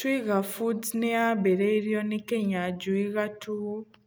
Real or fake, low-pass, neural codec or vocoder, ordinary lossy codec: real; none; none; none